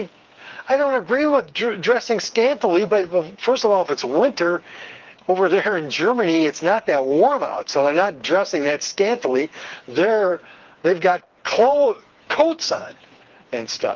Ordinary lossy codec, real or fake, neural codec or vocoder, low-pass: Opus, 24 kbps; fake; codec, 16 kHz, 4 kbps, FreqCodec, smaller model; 7.2 kHz